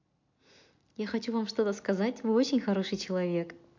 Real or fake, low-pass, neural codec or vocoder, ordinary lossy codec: real; 7.2 kHz; none; MP3, 48 kbps